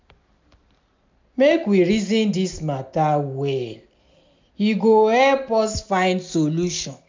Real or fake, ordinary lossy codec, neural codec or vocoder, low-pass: real; MP3, 64 kbps; none; 7.2 kHz